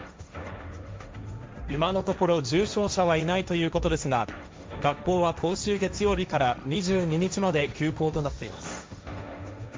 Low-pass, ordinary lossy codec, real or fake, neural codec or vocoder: none; none; fake; codec, 16 kHz, 1.1 kbps, Voila-Tokenizer